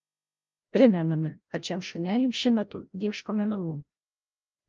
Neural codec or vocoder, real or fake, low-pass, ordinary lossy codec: codec, 16 kHz, 0.5 kbps, FreqCodec, larger model; fake; 7.2 kHz; Opus, 24 kbps